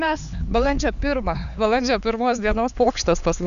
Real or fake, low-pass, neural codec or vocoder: fake; 7.2 kHz; codec, 16 kHz, 4 kbps, X-Codec, HuBERT features, trained on LibriSpeech